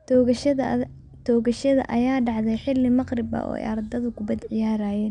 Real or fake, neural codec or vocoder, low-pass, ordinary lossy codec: real; none; 9.9 kHz; none